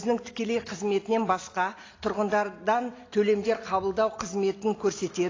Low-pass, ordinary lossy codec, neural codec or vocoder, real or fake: 7.2 kHz; AAC, 32 kbps; none; real